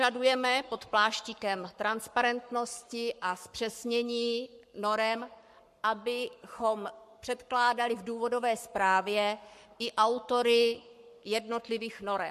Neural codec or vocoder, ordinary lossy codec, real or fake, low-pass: codec, 44.1 kHz, 7.8 kbps, Pupu-Codec; MP3, 64 kbps; fake; 14.4 kHz